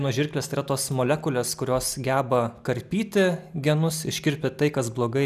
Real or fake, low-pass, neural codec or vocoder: real; 14.4 kHz; none